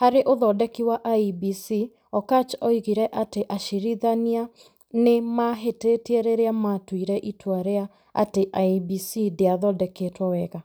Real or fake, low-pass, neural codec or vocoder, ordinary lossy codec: real; none; none; none